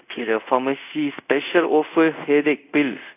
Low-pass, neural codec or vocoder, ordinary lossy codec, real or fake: 3.6 kHz; codec, 24 kHz, 0.5 kbps, DualCodec; AAC, 32 kbps; fake